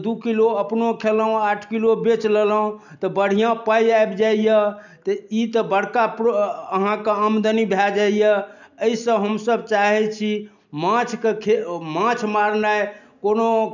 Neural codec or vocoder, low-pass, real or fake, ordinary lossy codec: none; 7.2 kHz; real; none